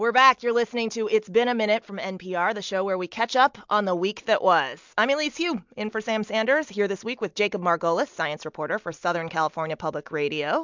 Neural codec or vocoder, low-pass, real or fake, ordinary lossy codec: none; 7.2 kHz; real; MP3, 64 kbps